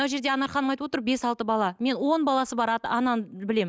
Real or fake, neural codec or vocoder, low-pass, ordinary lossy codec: real; none; none; none